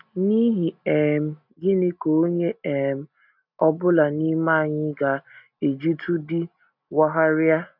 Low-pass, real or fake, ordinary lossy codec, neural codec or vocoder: 5.4 kHz; real; none; none